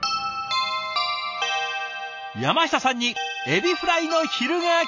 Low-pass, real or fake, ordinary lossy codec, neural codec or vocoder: 7.2 kHz; real; none; none